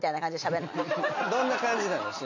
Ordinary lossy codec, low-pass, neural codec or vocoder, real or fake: AAC, 48 kbps; 7.2 kHz; none; real